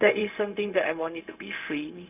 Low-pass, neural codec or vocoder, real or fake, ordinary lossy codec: 3.6 kHz; codec, 16 kHz, 0.4 kbps, LongCat-Audio-Codec; fake; none